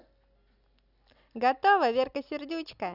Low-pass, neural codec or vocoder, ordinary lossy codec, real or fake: 5.4 kHz; none; none; real